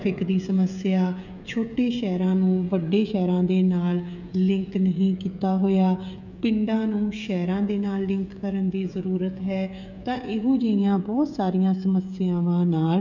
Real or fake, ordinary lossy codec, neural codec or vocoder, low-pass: fake; none; codec, 16 kHz, 16 kbps, FreqCodec, smaller model; 7.2 kHz